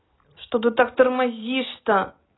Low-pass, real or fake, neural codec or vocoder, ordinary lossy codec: 7.2 kHz; real; none; AAC, 16 kbps